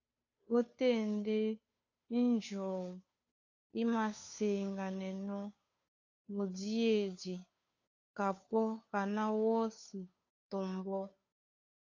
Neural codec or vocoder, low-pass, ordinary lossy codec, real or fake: codec, 16 kHz, 2 kbps, FunCodec, trained on Chinese and English, 25 frames a second; 7.2 kHz; AAC, 48 kbps; fake